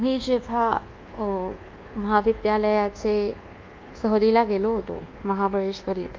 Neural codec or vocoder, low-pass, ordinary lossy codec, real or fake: codec, 24 kHz, 1.2 kbps, DualCodec; 7.2 kHz; Opus, 24 kbps; fake